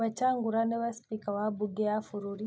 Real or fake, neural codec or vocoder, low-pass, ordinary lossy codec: real; none; none; none